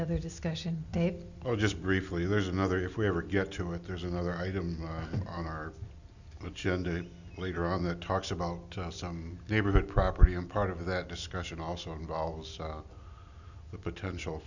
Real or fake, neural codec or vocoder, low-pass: real; none; 7.2 kHz